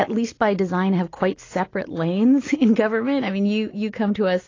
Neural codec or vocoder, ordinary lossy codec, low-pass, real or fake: none; AAC, 32 kbps; 7.2 kHz; real